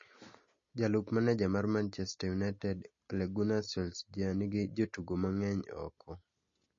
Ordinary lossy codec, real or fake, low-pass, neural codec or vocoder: MP3, 32 kbps; real; 7.2 kHz; none